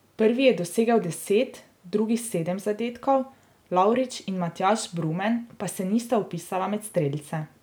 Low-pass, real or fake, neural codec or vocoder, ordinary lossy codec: none; real; none; none